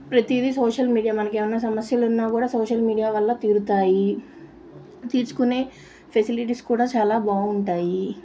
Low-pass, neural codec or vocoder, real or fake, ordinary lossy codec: none; none; real; none